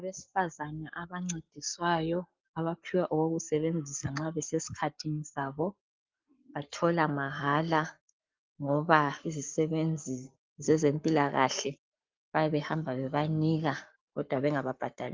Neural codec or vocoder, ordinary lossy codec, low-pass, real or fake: codec, 44.1 kHz, 7.8 kbps, Pupu-Codec; Opus, 24 kbps; 7.2 kHz; fake